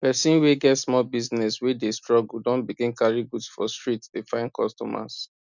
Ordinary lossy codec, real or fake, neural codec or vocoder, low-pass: none; real; none; 7.2 kHz